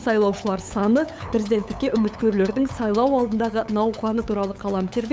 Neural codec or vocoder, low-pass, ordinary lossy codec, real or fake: codec, 16 kHz, 8 kbps, FunCodec, trained on LibriTTS, 25 frames a second; none; none; fake